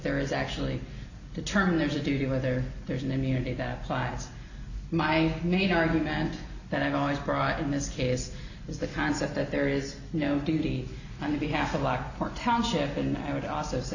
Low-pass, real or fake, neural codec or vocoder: 7.2 kHz; real; none